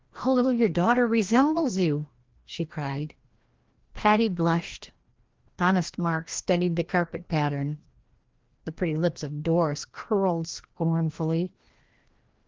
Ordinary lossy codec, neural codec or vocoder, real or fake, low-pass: Opus, 16 kbps; codec, 16 kHz, 1 kbps, FreqCodec, larger model; fake; 7.2 kHz